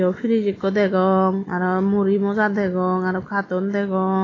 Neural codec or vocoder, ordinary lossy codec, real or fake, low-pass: none; AAC, 32 kbps; real; 7.2 kHz